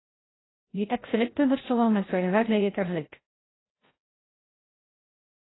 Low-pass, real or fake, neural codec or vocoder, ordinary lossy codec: 7.2 kHz; fake; codec, 16 kHz, 0.5 kbps, FreqCodec, larger model; AAC, 16 kbps